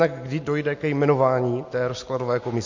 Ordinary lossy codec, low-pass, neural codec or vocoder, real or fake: MP3, 48 kbps; 7.2 kHz; none; real